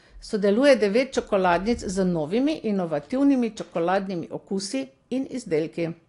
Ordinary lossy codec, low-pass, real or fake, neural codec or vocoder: AAC, 48 kbps; 10.8 kHz; real; none